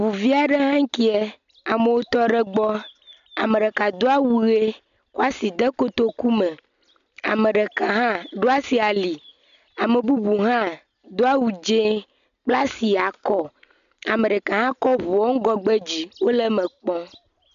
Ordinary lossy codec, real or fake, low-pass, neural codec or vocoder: AAC, 96 kbps; real; 7.2 kHz; none